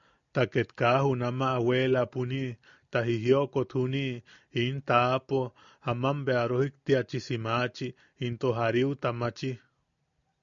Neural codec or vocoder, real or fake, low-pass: none; real; 7.2 kHz